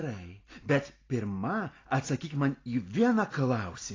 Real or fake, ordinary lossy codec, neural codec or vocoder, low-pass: real; AAC, 32 kbps; none; 7.2 kHz